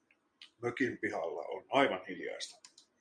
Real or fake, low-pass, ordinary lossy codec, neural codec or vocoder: real; 9.9 kHz; MP3, 96 kbps; none